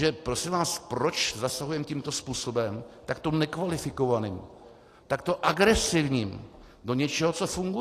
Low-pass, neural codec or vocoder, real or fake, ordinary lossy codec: 14.4 kHz; none; real; AAC, 48 kbps